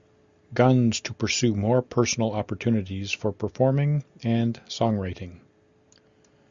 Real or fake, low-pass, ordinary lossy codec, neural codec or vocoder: real; 7.2 kHz; Opus, 64 kbps; none